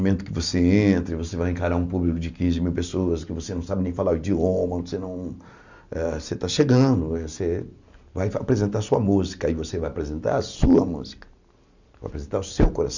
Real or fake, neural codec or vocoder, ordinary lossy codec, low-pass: real; none; none; 7.2 kHz